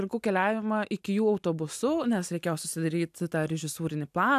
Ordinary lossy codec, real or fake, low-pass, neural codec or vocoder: AAC, 96 kbps; real; 14.4 kHz; none